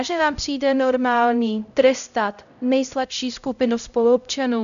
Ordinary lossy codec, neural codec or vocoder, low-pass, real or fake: AAC, 96 kbps; codec, 16 kHz, 0.5 kbps, X-Codec, HuBERT features, trained on LibriSpeech; 7.2 kHz; fake